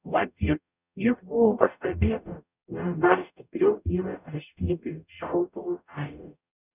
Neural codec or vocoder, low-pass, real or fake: codec, 44.1 kHz, 0.9 kbps, DAC; 3.6 kHz; fake